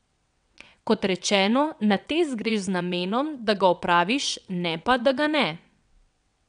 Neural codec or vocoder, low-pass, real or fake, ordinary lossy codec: vocoder, 22.05 kHz, 80 mel bands, WaveNeXt; 9.9 kHz; fake; none